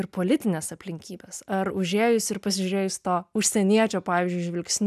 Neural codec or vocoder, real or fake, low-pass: none; real; 14.4 kHz